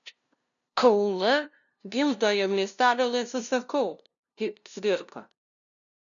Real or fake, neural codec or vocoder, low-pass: fake; codec, 16 kHz, 0.5 kbps, FunCodec, trained on LibriTTS, 25 frames a second; 7.2 kHz